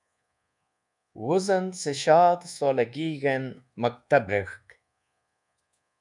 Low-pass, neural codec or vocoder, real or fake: 10.8 kHz; codec, 24 kHz, 1.2 kbps, DualCodec; fake